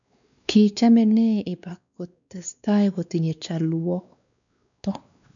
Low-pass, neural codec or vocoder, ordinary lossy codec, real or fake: 7.2 kHz; codec, 16 kHz, 2 kbps, X-Codec, WavLM features, trained on Multilingual LibriSpeech; none; fake